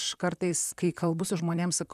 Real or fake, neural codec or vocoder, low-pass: fake; vocoder, 44.1 kHz, 128 mel bands, Pupu-Vocoder; 14.4 kHz